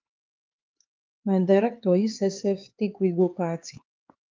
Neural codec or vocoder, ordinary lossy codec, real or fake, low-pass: codec, 16 kHz, 4 kbps, X-Codec, HuBERT features, trained on LibriSpeech; Opus, 24 kbps; fake; 7.2 kHz